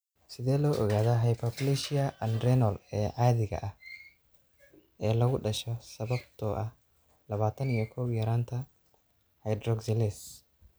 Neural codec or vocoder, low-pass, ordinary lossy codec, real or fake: none; none; none; real